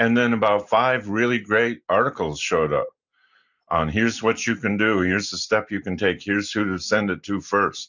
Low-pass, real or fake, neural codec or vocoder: 7.2 kHz; real; none